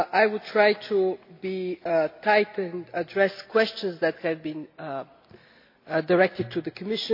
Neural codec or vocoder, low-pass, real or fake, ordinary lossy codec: none; 5.4 kHz; real; MP3, 32 kbps